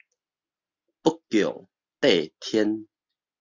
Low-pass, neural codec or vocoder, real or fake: 7.2 kHz; none; real